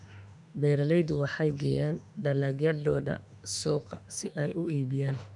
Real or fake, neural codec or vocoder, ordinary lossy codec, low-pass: fake; autoencoder, 48 kHz, 32 numbers a frame, DAC-VAE, trained on Japanese speech; none; 10.8 kHz